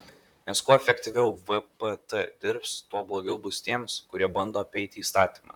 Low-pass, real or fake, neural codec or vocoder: 19.8 kHz; fake; vocoder, 44.1 kHz, 128 mel bands, Pupu-Vocoder